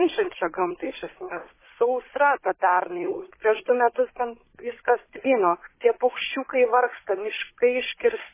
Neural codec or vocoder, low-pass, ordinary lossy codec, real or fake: codec, 16 kHz, 16 kbps, FunCodec, trained on Chinese and English, 50 frames a second; 3.6 kHz; MP3, 16 kbps; fake